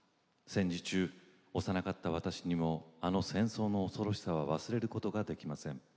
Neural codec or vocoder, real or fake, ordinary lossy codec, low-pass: none; real; none; none